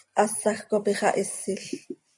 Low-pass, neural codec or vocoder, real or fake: 10.8 kHz; none; real